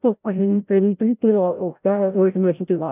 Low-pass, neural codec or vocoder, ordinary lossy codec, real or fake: 3.6 kHz; codec, 16 kHz, 0.5 kbps, FreqCodec, larger model; none; fake